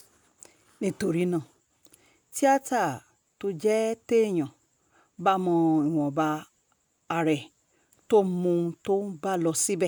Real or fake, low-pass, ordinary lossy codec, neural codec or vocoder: real; none; none; none